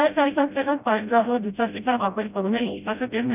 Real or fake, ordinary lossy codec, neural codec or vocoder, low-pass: fake; none; codec, 16 kHz, 0.5 kbps, FreqCodec, smaller model; 3.6 kHz